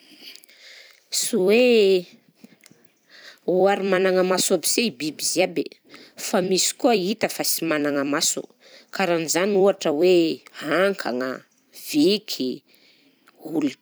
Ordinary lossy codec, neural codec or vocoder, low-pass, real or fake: none; vocoder, 44.1 kHz, 128 mel bands every 256 samples, BigVGAN v2; none; fake